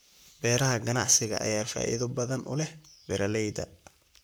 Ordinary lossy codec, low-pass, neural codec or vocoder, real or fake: none; none; codec, 44.1 kHz, 7.8 kbps, Pupu-Codec; fake